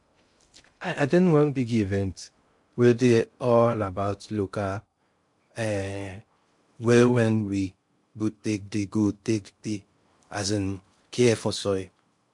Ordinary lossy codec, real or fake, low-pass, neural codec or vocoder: MP3, 64 kbps; fake; 10.8 kHz; codec, 16 kHz in and 24 kHz out, 0.6 kbps, FocalCodec, streaming, 2048 codes